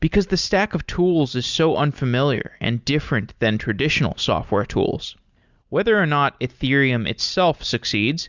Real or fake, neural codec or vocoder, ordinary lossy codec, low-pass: real; none; Opus, 64 kbps; 7.2 kHz